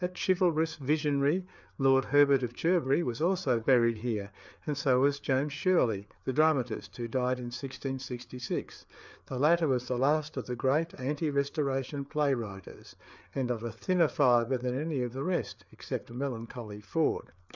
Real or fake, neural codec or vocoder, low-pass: fake; codec, 16 kHz, 4 kbps, FreqCodec, larger model; 7.2 kHz